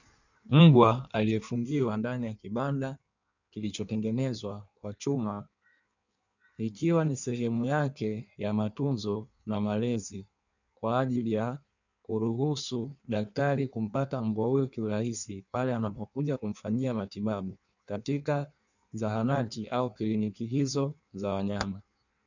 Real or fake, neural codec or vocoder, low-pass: fake; codec, 16 kHz in and 24 kHz out, 1.1 kbps, FireRedTTS-2 codec; 7.2 kHz